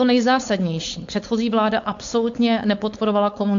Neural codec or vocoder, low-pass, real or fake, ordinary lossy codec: codec, 16 kHz, 4.8 kbps, FACodec; 7.2 kHz; fake; AAC, 64 kbps